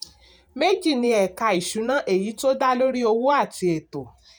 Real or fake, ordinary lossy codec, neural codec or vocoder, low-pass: fake; none; vocoder, 48 kHz, 128 mel bands, Vocos; none